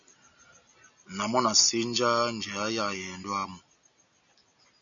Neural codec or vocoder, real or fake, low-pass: none; real; 7.2 kHz